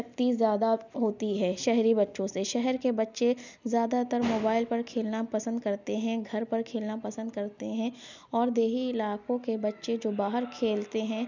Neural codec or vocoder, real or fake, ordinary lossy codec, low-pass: none; real; none; 7.2 kHz